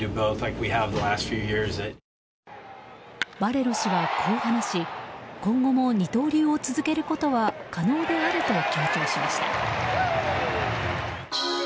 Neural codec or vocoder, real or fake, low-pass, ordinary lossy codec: none; real; none; none